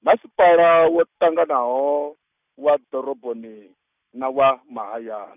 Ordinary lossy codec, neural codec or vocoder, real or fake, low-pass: none; none; real; 3.6 kHz